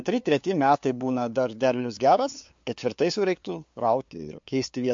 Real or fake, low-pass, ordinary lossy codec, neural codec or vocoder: fake; 7.2 kHz; MP3, 48 kbps; codec, 16 kHz, 2 kbps, FunCodec, trained on LibriTTS, 25 frames a second